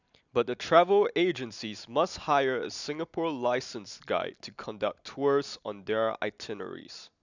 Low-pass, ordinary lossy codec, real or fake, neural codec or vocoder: 7.2 kHz; none; real; none